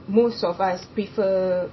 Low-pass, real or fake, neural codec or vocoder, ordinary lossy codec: 7.2 kHz; real; none; MP3, 24 kbps